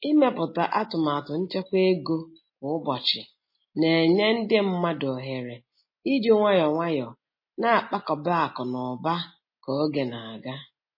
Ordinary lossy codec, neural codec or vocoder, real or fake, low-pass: MP3, 24 kbps; none; real; 5.4 kHz